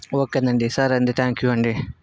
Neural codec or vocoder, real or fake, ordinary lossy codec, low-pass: none; real; none; none